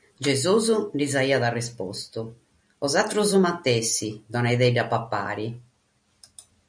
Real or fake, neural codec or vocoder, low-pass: real; none; 9.9 kHz